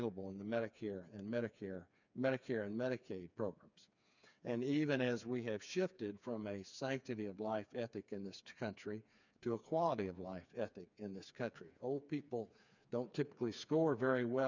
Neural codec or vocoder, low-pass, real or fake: codec, 16 kHz, 4 kbps, FreqCodec, smaller model; 7.2 kHz; fake